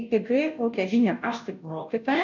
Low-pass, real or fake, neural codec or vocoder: 7.2 kHz; fake; codec, 16 kHz, 0.5 kbps, FunCodec, trained on Chinese and English, 25 frames a second